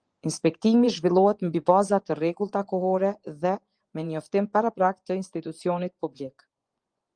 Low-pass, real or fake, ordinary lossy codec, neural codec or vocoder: 9.9 kHz; fake; Opus, 24 kbps; vocoder, 24 kHz, 100 mel bands, Vocos